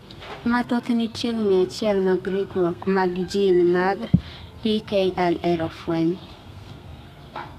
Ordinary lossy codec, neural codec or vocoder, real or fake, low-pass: none; codec, 32 kHz, 1.9 kbps, SNAC; fake; 14.4 kHz